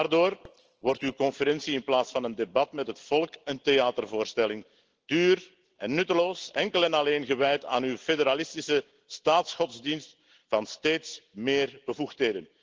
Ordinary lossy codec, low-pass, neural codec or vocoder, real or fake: Opus, 16 kbps; 7.2 kHz; none; real